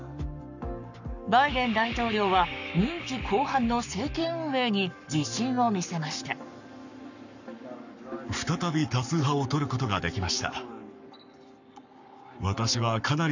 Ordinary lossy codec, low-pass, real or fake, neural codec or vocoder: none; 7.2 kHz; fake; codec, 44.1 kHz, 7.8 kbps, Pupu-Codec